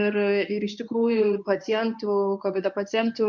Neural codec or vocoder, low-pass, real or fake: codec, 24 kHz, 0.9 kbps, WavTokenizer, medium speech release version 1; 7.2 kHz; fake